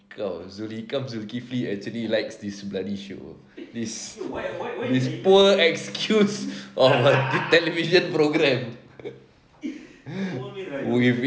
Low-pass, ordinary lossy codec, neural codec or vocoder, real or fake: none; none; none; real